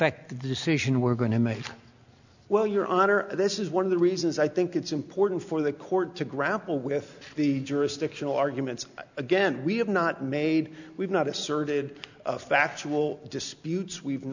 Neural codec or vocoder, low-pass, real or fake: none; 7.2 kHz; real